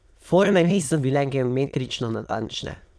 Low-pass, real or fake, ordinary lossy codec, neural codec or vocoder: none; fake; none; autoencoder, 22.05 kHz, a latent of 192 numbers a frame, VITS, trained on many speakers